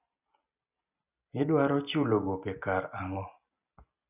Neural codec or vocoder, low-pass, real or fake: none; 3.6 kHz; real